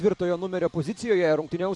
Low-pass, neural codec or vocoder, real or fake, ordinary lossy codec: 10.8 kHz; vocoder, 44.1 kHz, 128 mel bands every 256 samples, BigVGAN v2; fake; MP3, 64 kbps